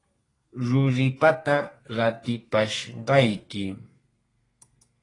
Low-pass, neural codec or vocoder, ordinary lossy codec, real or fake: 10.8 kHz; codec, 32 kHz, 1.9 kbps, SNAC; AAC, 32 kbps; fake